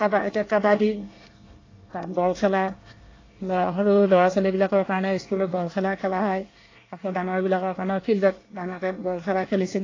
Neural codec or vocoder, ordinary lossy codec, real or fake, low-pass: codec, 24 kHz, 1 kbps, SNAC; AAC, 32 kbps; fake; 7.2 kHz